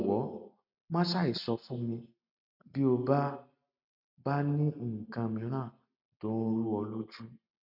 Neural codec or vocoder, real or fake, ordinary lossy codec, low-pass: none; real; none; 5.4 kHz